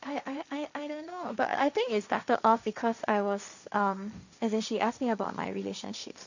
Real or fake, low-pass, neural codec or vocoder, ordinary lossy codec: fake; 7.2 kHz; codec, 16 kHz, 1.1 kbps, Voila-Tokenizer; none